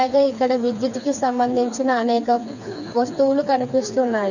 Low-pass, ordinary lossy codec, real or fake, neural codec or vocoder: 7.2 kHz; none; fake; codec, 16 kHz, 4 kbps, FreqCodec, smaller model